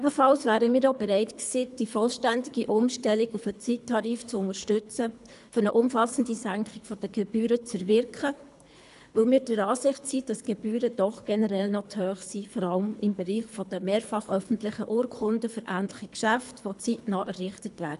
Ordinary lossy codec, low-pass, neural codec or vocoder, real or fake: AAC, 96 kbps; 10.8 kHz; codec, 24 kHz, 3 kbps, HILCodec; fake